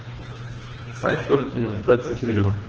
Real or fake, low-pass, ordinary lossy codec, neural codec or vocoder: fake; 7.2 kHz; Opus, 16 kbps; codec, 24 kHz, 1.5 kbps, HILCodec